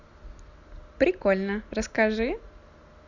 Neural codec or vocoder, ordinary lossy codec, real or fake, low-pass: none; Opus, 64 kbps; real; 7.2 kHz